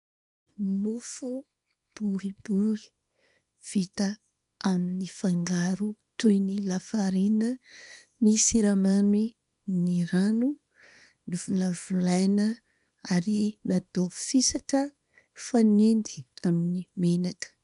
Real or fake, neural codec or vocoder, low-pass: fake; codec, 24 kHz, 0.9 kbps, WavTokenizer, small release; 10.8 kHz